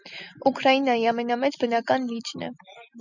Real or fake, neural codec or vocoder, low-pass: real; none; 7.2 kHz